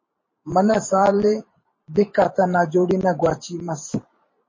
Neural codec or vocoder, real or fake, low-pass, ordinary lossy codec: vocoder, 44.1 kHz, 128 mel bands every 512 samples, BigVGAN v2; fake; 7.2 kHz; MP3, 32 kbps